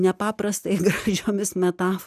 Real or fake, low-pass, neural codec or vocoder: real; 14.4 kHz; none